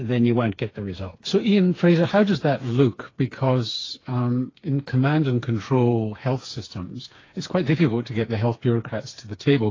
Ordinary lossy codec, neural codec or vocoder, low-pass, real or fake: AAC, 32 kbps; codec, 16 kHz, 4 kbps, FreqCodec, smaller model; 7.2 kHz; fake